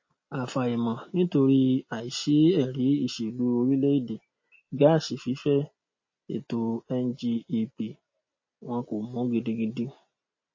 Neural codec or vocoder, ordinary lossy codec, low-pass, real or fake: none; MP3, 32 kbps; 7.2 kHz; real